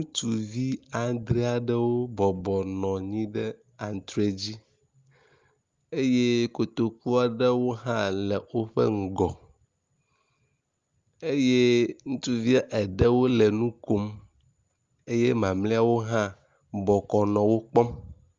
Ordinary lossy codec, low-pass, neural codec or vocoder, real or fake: Opus, 32 kbps; 7.2 kHz; none; real